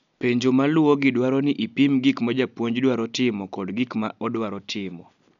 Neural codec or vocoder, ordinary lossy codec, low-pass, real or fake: none; none; 7.2 kHz; real